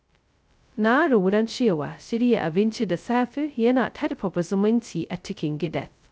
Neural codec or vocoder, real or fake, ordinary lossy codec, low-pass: codec, 16 kHz, 0.2 kbps, FocalCodec; fake; none; none